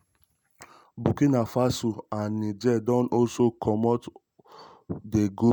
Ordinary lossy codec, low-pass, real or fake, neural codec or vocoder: none; none; real; none